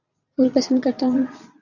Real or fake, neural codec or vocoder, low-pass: fake; vocoder, 22.05 kHz, 80 mel bands, Vocos; 7.2 kHz